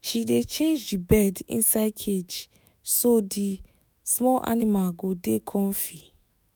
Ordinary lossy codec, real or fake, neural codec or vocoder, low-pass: none; fake; autoencoder, 48 kHz, 128 numbers a frame, DAC-VAE, trained on Japanese speech; none